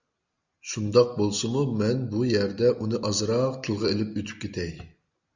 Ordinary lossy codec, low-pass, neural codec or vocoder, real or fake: Opus, 64 kbps; 7.2 kHz; none; real